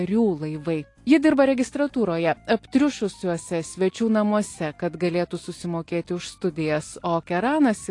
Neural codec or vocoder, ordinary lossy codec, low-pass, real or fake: none; AAC, 48 kbps; 10.8 kHz; real